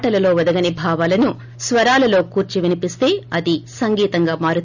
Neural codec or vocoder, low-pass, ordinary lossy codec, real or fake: none; 7.2 kHz; none; real